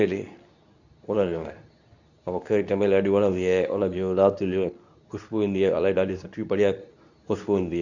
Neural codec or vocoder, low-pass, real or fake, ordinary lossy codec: codec, 24 kHz, 0.9 kbps, WavTokenizer, medium speech release version 2; 7.2 kHz; fake; none